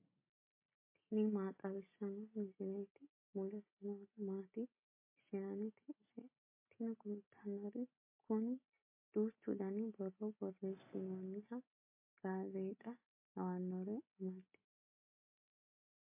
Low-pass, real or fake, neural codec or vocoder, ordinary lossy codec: 3.6 kHz; real; none; MP3, 24 kbps